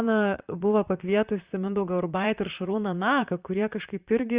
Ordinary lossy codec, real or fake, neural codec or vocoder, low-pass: Opus, 64 kbps; fake; vocoder, 44.1 kHz, 128 mel bands, Pupu-Vocoder; 3.6 kHz